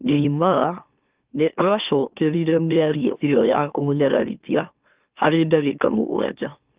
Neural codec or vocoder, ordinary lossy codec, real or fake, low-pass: autoencoder, 44.1 kHz, a latent of 192 numbers a frame, MeloTTS; Opus, 24 kbps; fake; 3.6 kHz